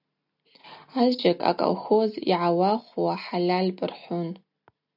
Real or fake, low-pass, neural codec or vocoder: real; 5.4 kHz; none